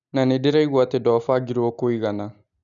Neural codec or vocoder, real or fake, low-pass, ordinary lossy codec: none; real; 7.2 kHz; none